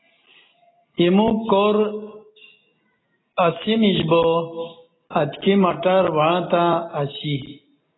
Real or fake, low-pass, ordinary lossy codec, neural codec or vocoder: real; 7.2 kHz; AAC, 16 kbps; none